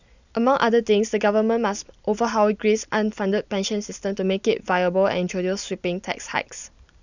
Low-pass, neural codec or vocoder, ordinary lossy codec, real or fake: 7.2 kHz; none; none; real